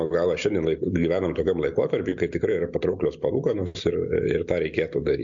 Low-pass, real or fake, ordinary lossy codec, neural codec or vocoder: 7.2 kHz; real; AAC, 64 kbps; none